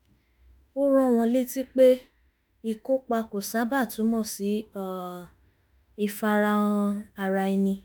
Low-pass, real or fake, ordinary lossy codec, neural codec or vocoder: none; fake; none; autoencoder, 48 kHz, 32 numbers a frame, DAC-VAE, trained on Japanese speech